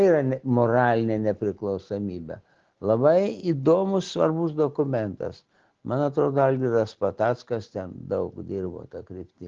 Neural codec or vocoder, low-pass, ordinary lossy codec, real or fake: none; 7.2 kHz; Opus, 16 kbps; real